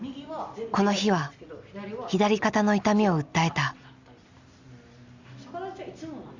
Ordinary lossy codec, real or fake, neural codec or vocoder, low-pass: Opus, 64 kbps; real; none; 7.2 kHz